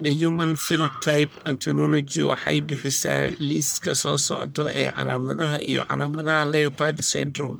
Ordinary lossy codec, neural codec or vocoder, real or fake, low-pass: none; codec, 44.1 kHz, 1.7 kbps, Pupu-Codec; fake; none